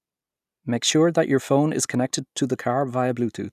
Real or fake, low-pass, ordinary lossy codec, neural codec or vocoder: real; 14.4 kHz; none; none